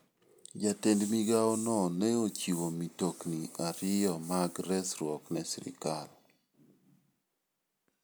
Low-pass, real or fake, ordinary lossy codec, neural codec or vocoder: none; real; none; none